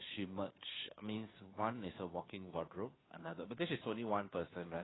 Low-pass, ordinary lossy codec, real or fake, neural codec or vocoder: 7.2 kHz; AAC, 16 kbps; fake; codec, 16 kHz, 2 kbps, FunCodec, trained on Chinese and English, 25 frames a second